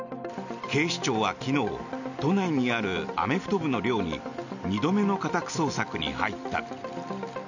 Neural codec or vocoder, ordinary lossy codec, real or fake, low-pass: none; none; real; 7.2 kHz